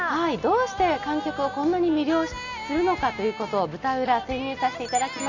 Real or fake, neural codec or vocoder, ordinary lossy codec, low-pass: real; none; none; 7.2 kHz